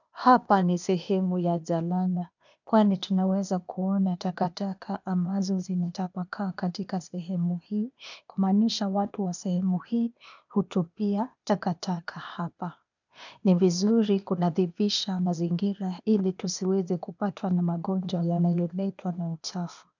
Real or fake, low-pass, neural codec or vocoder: fake; 7.2 kHz; codec, 16 kHz, 0.8 kbps, ZipCodec